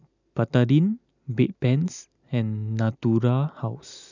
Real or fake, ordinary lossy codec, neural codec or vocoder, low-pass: real; none; none; 7.2 kHz